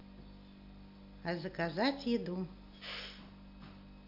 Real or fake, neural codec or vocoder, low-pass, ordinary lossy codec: real; none; 5.4 kHz; MP3, 48 kbps